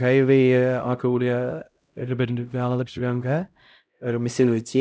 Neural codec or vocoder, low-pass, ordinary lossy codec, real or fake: codec, 16 kHz, 0.5 kbps, X-Codec, HuBERT features, trained on LibriSpeech; none; none; fake